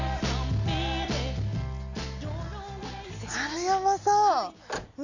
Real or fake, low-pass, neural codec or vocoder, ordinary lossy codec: real; 7.2 kHz; none; none